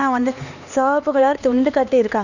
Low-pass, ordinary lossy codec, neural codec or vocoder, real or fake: 7.2 kHz; none; codec, 16 kHz, 2 kbps, X-Codec, HuBERT features, trained on LibriSpeech; fake